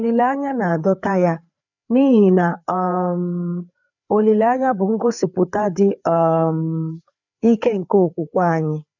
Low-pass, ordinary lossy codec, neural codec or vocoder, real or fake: 7.2 kHz; none; codec, 16 kHz, 4 kbps, FreqCodec, larger model; fake